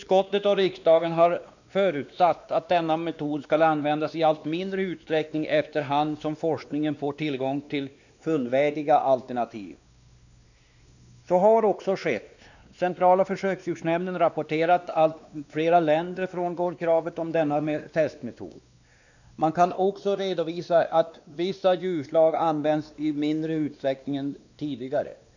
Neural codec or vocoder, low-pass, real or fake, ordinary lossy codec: codec, 16 kHz, 2 kbps, X-Codec, WavLM features, trained on Multilingual LibriSpeech; 7.2 kHz; fake; none